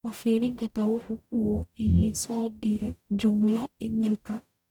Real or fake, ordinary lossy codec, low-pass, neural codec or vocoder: fake; none; 19.8 kHz; codec, 44.1 kHz, 0.9 kbps, DAC